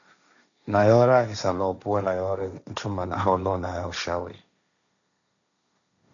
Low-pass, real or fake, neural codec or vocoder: 7.2 kHz; fake; codec, 16 kHz, 1.1 kbps, Voila-Tokenizer